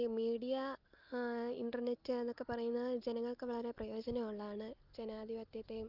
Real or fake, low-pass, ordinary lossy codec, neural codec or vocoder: real; 5.4 kHz; Opus, 24 kbps; none